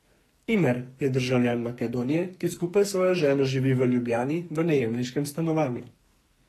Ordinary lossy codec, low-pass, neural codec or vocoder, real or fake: AAC, 48 kbps; 14.4 kHz; codec, 44.1 kHz, 2.6 kbps, SNAC; fake